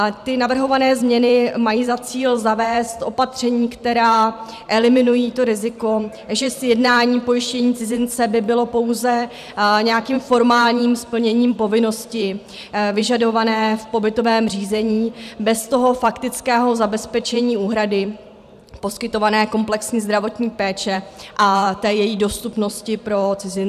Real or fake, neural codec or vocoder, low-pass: fake; vocoder, 44.1 kHz, 128 mel bands every 512 samples, BigVGAN v2; 14.4 kHz